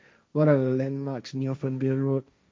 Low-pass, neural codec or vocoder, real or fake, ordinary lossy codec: none; codec, 16 kHz, 1.1 kbps, Voila-Tokenizer; fake; none